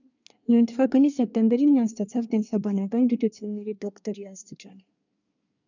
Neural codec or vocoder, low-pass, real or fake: codec, 24 kHz, 1 kbps, SNAC; 7.2 kHz; fake